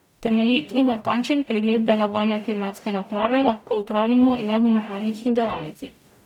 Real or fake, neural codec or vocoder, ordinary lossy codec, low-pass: fake; codec, 44.1 kHz, 0.9 kbps, DAC; none; 19.8 kHz